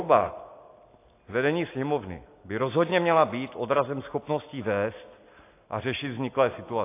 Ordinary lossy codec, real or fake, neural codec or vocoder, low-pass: AAC, 24 kbps; real; none; 3.6 kHz